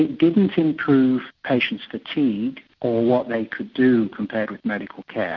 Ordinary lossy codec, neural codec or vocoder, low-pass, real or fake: MP3, 48 kbps; none; 7.2 kHz; real